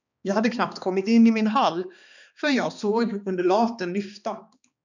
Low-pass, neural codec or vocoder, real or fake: 7.2 kHz; codec, 16 kHz, 2 kbps, X-Codec, HuBERT features, trained on balanced general audio; fake